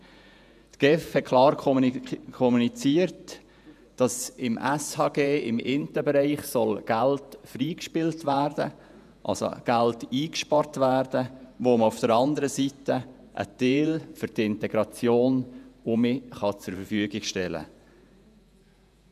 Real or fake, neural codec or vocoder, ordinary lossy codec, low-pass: fake; vocoder, 48 kHz, 128 mel bands, Vocos; none; 14.4 kHz